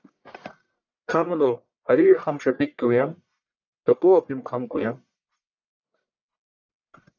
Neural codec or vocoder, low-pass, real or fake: codec, 44.1 kHz, 1.7 kbps, Pupu-Codec; 7.2 kHz; fake